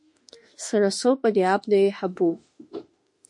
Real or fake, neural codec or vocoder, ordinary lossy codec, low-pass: fake; autoencoder, 48 kHz, 32 numbers a frame, DAC-VAE, trained on Japanese speech; MP3, 48 kbps; 10.8 kHz